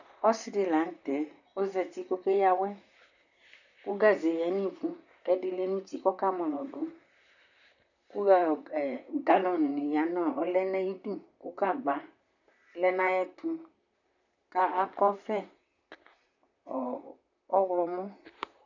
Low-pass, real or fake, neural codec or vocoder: 7.2 kHz; fake; vocoder, 44.1 kHz, 128 mel bands, Pupu-Vocoder